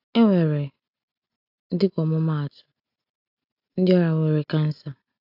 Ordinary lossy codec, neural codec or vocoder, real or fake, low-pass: AAC, 48 kbps; none; real; 5.4 kHz